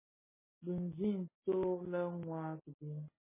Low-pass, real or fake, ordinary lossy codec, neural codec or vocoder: 3.6 kHz; real; MP3, 16 kbps; none